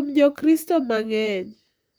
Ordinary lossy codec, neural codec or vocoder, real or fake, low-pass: none; vocoder, 44.1 kHz, 128 mel bands, Pupu-Vocoder; fake; none